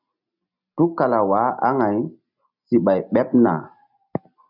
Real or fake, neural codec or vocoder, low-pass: real; none; 5.4 kHz